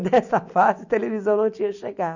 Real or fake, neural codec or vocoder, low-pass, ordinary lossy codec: real; none; 7.2 kHz; none